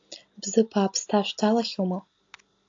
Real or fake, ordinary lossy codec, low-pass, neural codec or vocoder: real; AAC, 48 kbps; 7.2 kHz; none